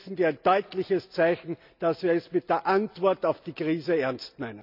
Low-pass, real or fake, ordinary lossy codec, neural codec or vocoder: 5.4 kHz; real; none; none